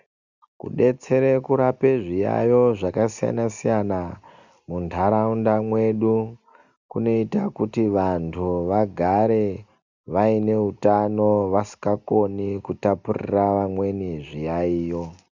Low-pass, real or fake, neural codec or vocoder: 7.2 kHz; real; none